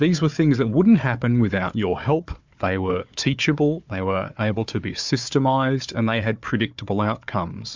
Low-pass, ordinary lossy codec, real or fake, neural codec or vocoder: 7.2 kHz; MP3, 64 kbps; fake; codec, 16 kHz, 4 kbps, FunCodec, trained on Chinese and English, 50 frames a second